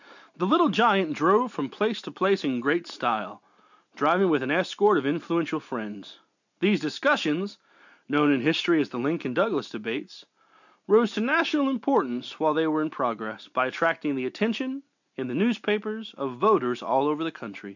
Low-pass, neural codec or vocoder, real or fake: 7.2 kHz; none; real